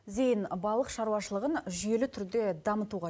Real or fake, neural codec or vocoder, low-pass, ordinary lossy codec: real; none; none; none